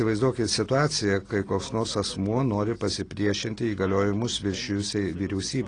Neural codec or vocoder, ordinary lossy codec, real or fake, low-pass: none; AAC, 32 kbps; real; 9.9 kHz